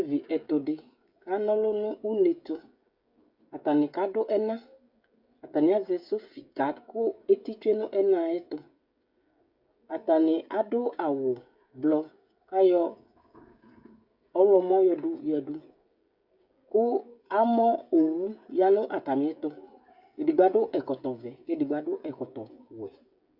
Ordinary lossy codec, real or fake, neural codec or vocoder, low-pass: Opus, 64 kbps; fake; codec, 16 kHz, 16 kbps, FreqCodec, smaller model; 5.4 kHz